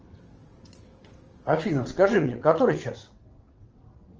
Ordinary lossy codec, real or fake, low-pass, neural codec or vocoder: Opus, 24 kbps; fake; 7.2 kHz; vocoder, 22.05 kHz, 80 mel bands, WaveNeXt